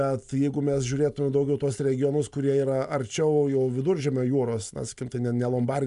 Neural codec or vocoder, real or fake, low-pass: none; real; 10.8 kHz